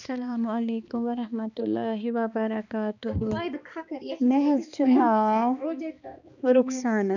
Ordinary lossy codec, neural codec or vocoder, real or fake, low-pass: none; codec, 16 kHz, 2 kbps, X-Codec, HuBERT features, trained on balanced general audio; fake; 7.2 kHz